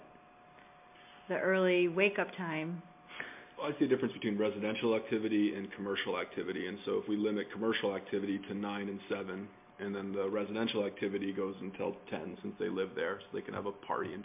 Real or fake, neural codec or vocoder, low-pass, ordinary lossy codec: real; none; 3.6 kHz; MP3, 32 kbps